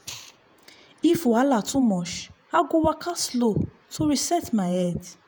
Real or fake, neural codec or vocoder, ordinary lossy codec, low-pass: fake; vocoder, 48 kHz, 128 mel bands, Vocos; none; none